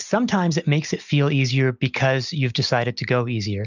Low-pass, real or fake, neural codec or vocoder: 7.2 kHz; real; none